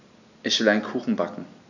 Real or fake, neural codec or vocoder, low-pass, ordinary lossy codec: real; none; 7.2 kHz; none